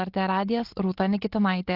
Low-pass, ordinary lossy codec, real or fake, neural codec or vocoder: 5.4 kHz; Opus, 16 kbps; real; none